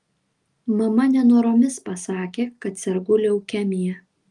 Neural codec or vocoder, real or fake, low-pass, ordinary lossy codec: none; real; 10.8 kHz; Opus, 32 kbps